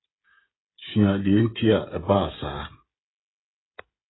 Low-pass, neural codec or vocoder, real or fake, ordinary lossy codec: 7.2 kHz; codec, 16 kHz, 16 kbps, FreqCodec, smaller model; fake; AAC, 16 kbps